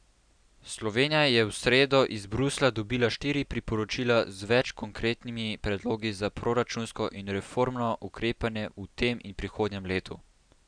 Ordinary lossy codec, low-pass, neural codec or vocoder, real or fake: none; 9.9 kHz; none; real